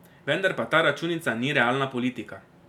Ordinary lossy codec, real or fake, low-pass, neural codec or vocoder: none; real; 19.8 kHz; none